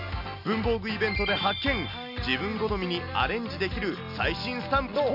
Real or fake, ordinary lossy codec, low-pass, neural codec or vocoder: real; none; 5.4 kHz; none